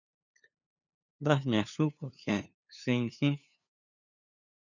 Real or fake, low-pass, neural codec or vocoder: fake; 7.2 kHz; codec, 16 kHz, 2 kbps, FunCodec, trained on LibriTTS, 25 frames a second